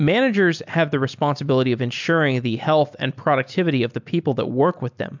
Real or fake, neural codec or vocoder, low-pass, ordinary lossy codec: real; none; 7.2 kHz; MP3, 64 kbps